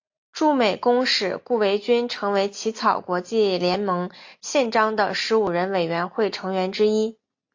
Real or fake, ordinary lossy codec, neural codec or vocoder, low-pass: real; AAC, 48 kbps; none; 7.2 kHz